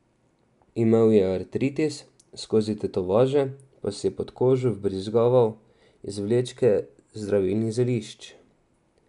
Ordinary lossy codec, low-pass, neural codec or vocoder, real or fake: none; 10.8 kHz; none; real